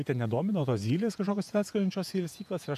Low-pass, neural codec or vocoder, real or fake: 14.4 kHz; vocoder, 48 kHz, 128 mel bands, Vocos; fake